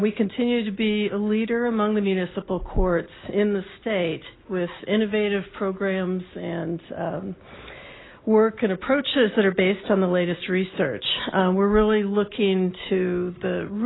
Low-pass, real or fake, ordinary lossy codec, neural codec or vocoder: 7.2 kHz; real; AAC, 16 kbps; none